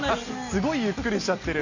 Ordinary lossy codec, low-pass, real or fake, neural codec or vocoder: none; 7.2 kHz; real; none